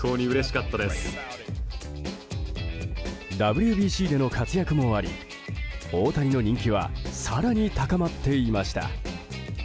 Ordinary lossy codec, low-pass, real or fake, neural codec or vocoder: none; none; real; none